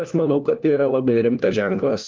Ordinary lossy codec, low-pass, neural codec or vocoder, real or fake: Opus, 24 kbps; 7.2 kHz; codec, 16 kHz, 1 kbps, FunCodec, trained on LibriTTS, 50 frames a second; fake